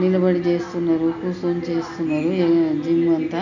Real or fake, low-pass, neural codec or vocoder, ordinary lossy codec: real; 7.2 kHz; none; none